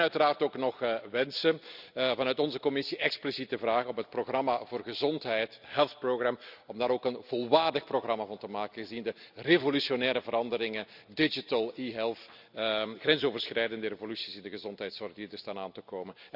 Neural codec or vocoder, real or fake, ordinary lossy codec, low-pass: none; real; none; 5.4 kHz